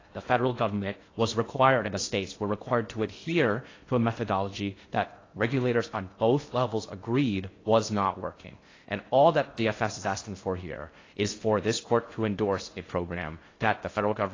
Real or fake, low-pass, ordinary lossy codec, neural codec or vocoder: fake; 7.2 kHz; AAC, 32 kbps; codec, 16 kHz in and 24 kHz out, 0.6 kbps, FocalCodec, streaming, 2048 codes